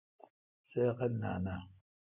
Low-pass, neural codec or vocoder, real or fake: 3.6 kHz; none; real